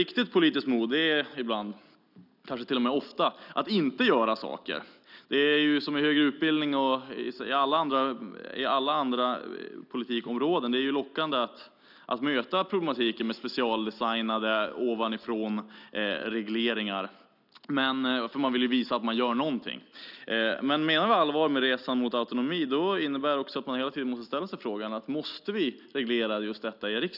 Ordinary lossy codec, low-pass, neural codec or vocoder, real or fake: none; 5.4 kHz; none; real